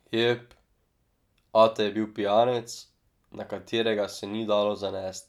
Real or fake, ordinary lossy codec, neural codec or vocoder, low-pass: real; none; none; 19.8 kHz